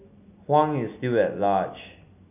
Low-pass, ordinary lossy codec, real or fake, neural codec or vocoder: 3.6 kHz; none; real; none